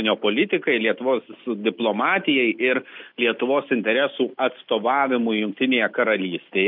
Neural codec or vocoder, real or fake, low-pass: none; real; 5.4 kHz